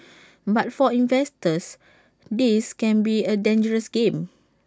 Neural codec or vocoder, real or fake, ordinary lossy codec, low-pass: none; real; none; none